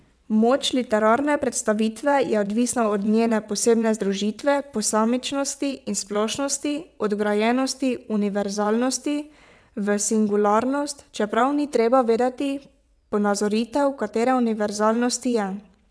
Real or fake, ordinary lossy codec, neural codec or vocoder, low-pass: fake; none; vocoder, 22.05 kHz, 80 mel bands, WaveNeXt; none